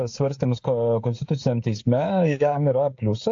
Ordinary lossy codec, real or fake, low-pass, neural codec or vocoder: AAC, 48 kbps; fake; 7.2 kHz; codec, 16 kHz, 16 kbps, FreqCodec, smaller model